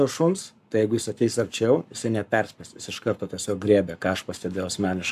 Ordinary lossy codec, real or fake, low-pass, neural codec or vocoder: AAC, 96 kbps; fake; 14.4 kHz; codec, 44.1 kHz, 7.8 kbps, Pupu-Codec